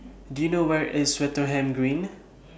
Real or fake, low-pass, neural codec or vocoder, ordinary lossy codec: real; none; none; none